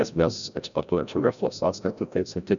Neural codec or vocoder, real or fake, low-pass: codec, 16 kHz, 0.5 kbps, FreqCodec, larger model; fake; 7.2 kHz